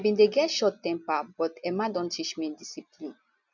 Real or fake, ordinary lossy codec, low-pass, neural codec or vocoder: real; none; 7.2 kHz; none